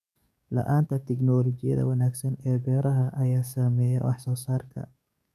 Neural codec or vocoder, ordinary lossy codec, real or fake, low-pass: codec, 44.1 kHz, 7.8 kbps, DAC; none; fake; 14.4 kHz